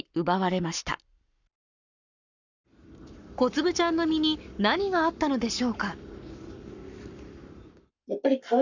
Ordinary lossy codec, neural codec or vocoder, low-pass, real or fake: none; codec, 44.1 kHz, 7.8 kbps, Pupu-Codec; 7.2 kHz; fake